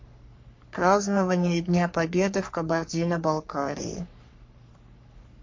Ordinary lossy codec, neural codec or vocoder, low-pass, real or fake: MP3, 48 kbps; codec, 44.1 kHz, 3.4 kbps, Pupu-Codec; 7.2 kHz; fake